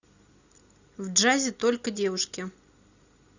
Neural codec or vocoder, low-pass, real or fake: none; 7.2 kHz; real